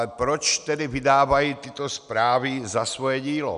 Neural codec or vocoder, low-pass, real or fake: none; 10.8 kHz; real